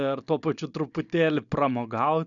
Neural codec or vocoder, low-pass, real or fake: none; 7.2 kHz; real